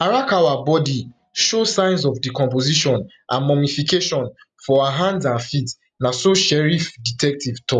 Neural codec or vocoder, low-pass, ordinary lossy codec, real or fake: none; 10.8 kHz; none; real